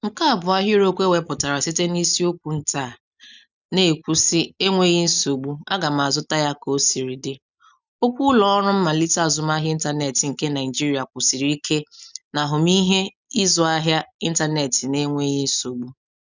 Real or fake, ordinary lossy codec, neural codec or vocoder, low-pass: real; none; none; 7.2 kHz